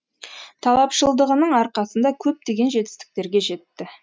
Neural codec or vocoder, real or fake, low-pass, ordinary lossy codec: none; real; none; none